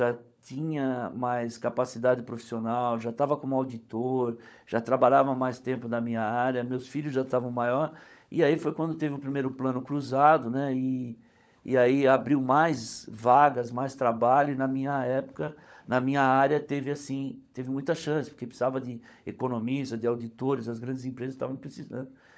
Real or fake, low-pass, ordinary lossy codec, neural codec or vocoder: fake; none; none; codec, 16 kHz, 16 kbps, FunCodec, trained on LibriTTS, 50 frames a second